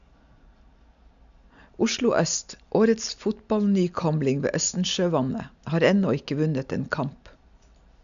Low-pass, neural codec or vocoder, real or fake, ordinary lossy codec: 7.2 kHz; none; real; none